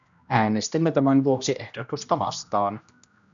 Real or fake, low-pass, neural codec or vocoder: fake; 7.2 kHz; codec, 16 kHz, 1 kbps, X-Codec, HuBERT features, trained on general audio